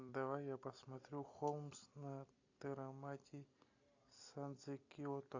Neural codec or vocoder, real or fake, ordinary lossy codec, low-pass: none; real; AAC, 48 kbps; 7.2 kHz